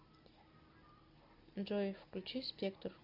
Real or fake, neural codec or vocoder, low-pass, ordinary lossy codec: real; none; 5.4 kHz; Opus, 64 kbps